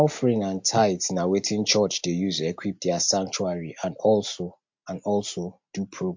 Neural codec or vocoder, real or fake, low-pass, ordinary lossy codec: none; real; 7.2 kHz; MP3, 48 kbps